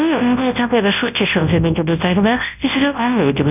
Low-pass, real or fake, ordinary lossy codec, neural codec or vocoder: 3.6 kHz; fake; none; codec, 24 kHz, 0.9 kbps, WavTokenizer, large speech release